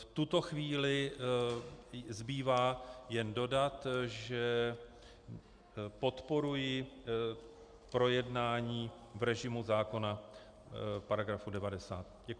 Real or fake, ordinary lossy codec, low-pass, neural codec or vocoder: real; Opus, 64 kbps; 9.9 kHz; none